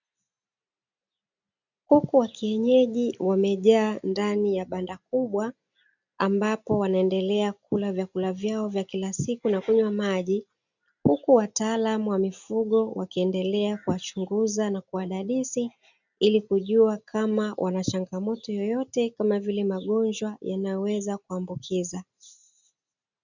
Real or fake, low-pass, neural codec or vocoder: real; 7.2 kHz; none